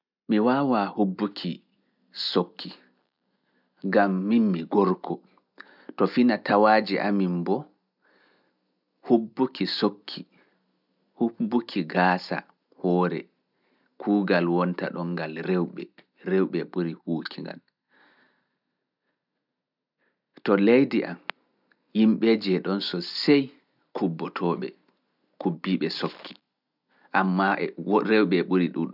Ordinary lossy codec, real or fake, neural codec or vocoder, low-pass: none; real; none; 5.4 kHz